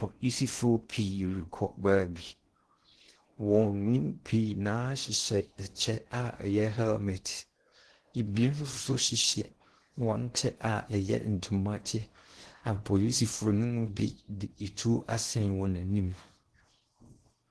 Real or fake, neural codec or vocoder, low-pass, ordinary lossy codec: fake; codec, 16 kHz in and 24 kHz out, 0.6 kbps, FocalCodec, streaming, 4096 codes; 10.8 kHz; Opus, 16 kbps